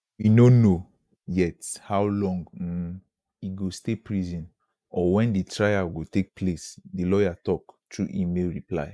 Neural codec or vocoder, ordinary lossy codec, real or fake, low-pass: none; none; real; none